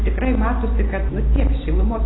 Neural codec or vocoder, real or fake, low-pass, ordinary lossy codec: none; real; 7.2 kHz; AAC, 16 kbps